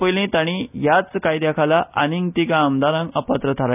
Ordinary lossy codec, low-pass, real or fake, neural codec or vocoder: none; 3.6 kHz; real; none